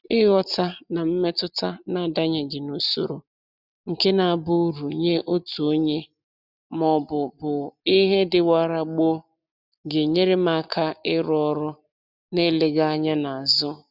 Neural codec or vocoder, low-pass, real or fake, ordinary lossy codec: none; 5.4 kHz; real; none